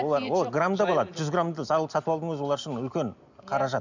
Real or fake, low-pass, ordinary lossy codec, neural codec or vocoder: real; 7.2 kHz; none; none